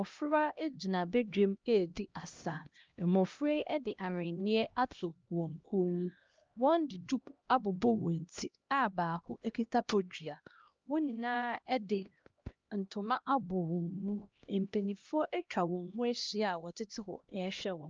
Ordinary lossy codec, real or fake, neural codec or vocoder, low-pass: Opus, 24 kbps; fake; codec, 16 kHz, 1 kbps, X-Codec, HuBERT features, trained on LibriSpeech; 7.2 kHz